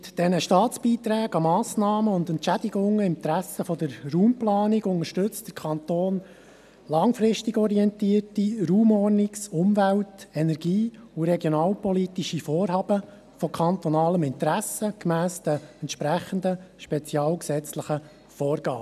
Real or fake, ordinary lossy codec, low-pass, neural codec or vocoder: real; none; 14.4 kHz; none